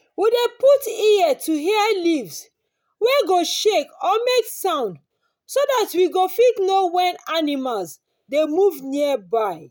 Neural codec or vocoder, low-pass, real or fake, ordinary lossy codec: none; none; real; none